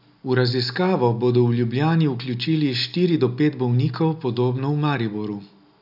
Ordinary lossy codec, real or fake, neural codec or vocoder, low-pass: none; real; none; 5.4 kHz